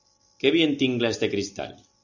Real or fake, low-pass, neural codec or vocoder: real; 7.2 kHz; none